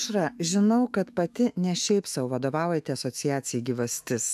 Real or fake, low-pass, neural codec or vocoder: fake; 14.4 kHz; autoencoder, 48 kHz, 128 numbers a frame, DAC-VAE, trained on Japanese speech